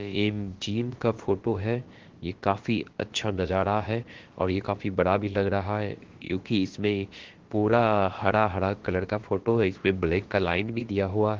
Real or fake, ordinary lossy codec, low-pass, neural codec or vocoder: fake; Opus, 16 kbps; 7.2 kHz; codec, 16 kHz, about 1 kbps, DyCAST, with the encoder's durations